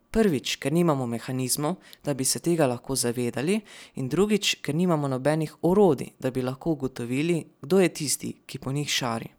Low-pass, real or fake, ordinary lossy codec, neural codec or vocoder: none; real; none; none